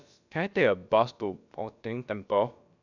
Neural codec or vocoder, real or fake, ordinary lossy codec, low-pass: codec, 16 kHz, about 1 kbps, DyCAST, with the encoder's durations; fake; none; 7.2 kHz